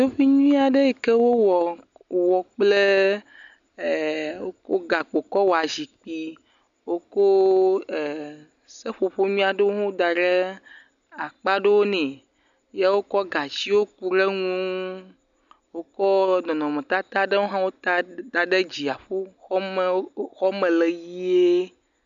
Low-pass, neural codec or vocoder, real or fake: 7.2 kHz; none; real